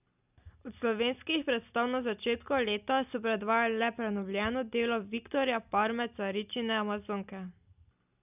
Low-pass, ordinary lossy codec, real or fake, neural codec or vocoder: 3.6 kHz; none; real; none